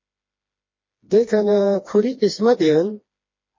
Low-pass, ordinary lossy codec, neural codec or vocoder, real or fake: 7.2 kHz; MP3, 32 kbps; codec, 16 kHz, 2 kbps, FreqCodec, smaller model; fake